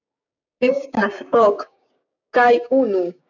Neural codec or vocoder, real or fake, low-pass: codec, 16 kHz, 6 kbps, DAC; fake; 7.2 kHz